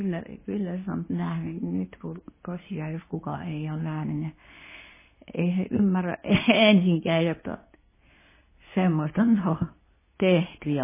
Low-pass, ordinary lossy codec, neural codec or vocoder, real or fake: 3.6 kHz; MP3, 16 kbps; codec, 24 kHz, 0.9 kbps, WavTokenizer, medium speech release version 2; fake